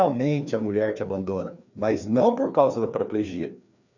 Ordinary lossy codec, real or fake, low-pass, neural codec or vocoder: none; fake; 7.2 kHz; codec, 16 kHz, 2 kbps, FreqCodec, larger model